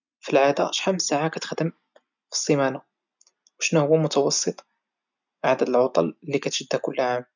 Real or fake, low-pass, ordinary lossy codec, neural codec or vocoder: real; 7.2 kHz; none; none